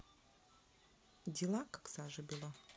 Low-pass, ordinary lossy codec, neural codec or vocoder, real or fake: none; none; none; real